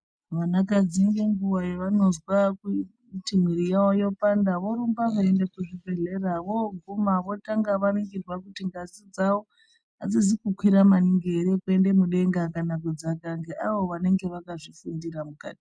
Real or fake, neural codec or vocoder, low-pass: real; none; 9.9 kHz